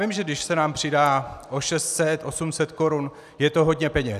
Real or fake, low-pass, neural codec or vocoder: real; 14.4 kHz; none